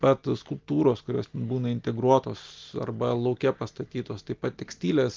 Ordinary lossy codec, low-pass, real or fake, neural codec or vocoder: Opus, 32 kbps; 7.2 kHz; real; none